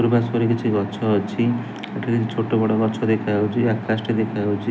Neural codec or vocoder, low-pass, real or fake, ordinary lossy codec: none; none; real; none